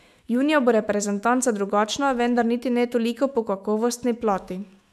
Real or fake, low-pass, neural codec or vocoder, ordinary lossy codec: fake; 14.4 kHz; autoencoder, 48 kHz, 128 numbers a frame, DAC-VAE, trained on Japanese speech; none